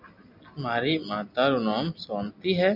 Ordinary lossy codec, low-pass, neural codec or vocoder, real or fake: MP3, 48 kbps; 5.4 kHz; none; real